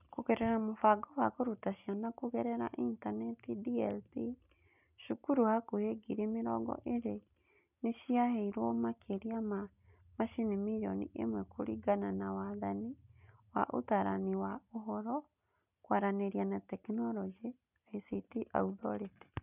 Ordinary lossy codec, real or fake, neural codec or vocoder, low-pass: none; real; none; 3.6 kHz